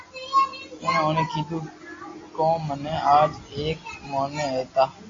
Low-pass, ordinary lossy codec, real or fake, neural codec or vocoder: 7.2 kHz; AAC, 32 kbps; real; none